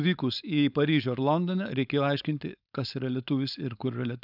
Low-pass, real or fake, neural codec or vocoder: 5.4 kHz; fake; codec, 16 kHz, 4.8 kbps, FACodec